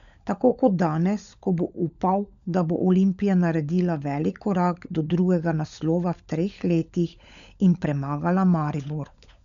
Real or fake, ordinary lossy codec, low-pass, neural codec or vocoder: fake; none; 7.2 kHz; codec, 16 kHz, 16 kbps, FunCodec, trained on LibriTTS, 50 frames a second